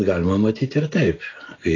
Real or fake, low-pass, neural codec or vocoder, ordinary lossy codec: real; 7.2 kHz; none; AAC, 32 kbps